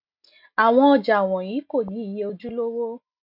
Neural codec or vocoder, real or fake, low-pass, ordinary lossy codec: none; real; 5.4 kHz; none